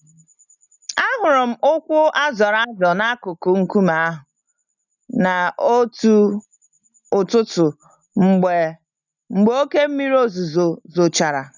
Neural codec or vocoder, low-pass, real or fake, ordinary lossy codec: none; 7.2 kHz; real; none